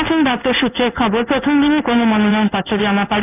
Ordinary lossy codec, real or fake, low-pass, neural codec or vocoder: none; fake; 3.6 kHz; codec, 16 kHz in and 24 kHz out, 1 kbps, XY-Tokenizer